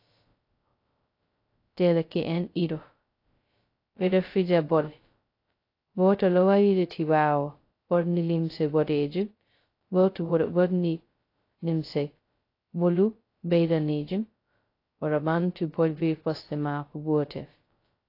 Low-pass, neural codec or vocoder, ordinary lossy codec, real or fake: 5.4 kHz; codec, 16 kHz, 0.2 kbps, FocalCodec; AAC, 32 kbps; fake